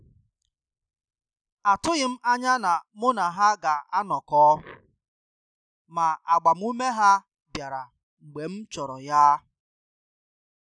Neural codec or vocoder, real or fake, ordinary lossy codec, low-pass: none; real; none; 9.9 kHz